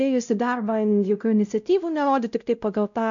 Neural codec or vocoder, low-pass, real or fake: codec, 16 kHz, 0.5 kbps, X-Codec, WavLM features, trained on Multilingual LibriSpeech; 7.2 kHz; fake